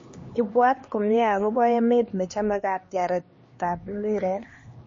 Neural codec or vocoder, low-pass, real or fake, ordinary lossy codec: codec, 16 kHz, 2 kbps, X-Codec, HuBERT features, trained on LibriSpeech; 7.2 kHz; fake; MP3, 32 kbps